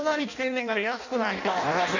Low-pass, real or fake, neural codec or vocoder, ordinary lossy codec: 7.2 kHz; fake; codec, 16 kHz in and 24 kHz out, 0.6 kbps, FireRedTTS-2 codec; none